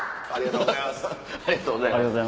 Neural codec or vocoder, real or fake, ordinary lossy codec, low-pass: none; real; none; none